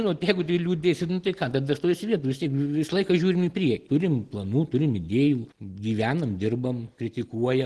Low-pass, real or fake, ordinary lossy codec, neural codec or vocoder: 10.8 kHz; real; Opus, 16 kbps; none